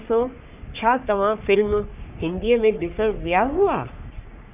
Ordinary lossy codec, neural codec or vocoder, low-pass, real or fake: none; codec, 44.1 kHz, 3.4 kbps, Pupu-Codec; 3.6 kHz; fake